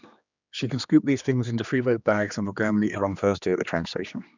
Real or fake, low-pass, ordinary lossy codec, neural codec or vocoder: fake; 7.2 kHz; none; codec, 16 kHz, 2 kbps, X-Codec, HuBERT features, trained on general audio